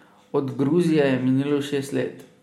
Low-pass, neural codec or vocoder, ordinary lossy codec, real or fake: 19.8 kHz; none; MP3, 64 kbps; real